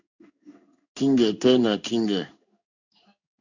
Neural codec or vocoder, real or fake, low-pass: codec, 16 kHz in and 24 kHz out, 1 kbps, XY-Tokenizer; fake; 7.2 kHz